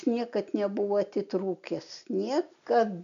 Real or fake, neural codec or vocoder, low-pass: real; none; 7.2 kHz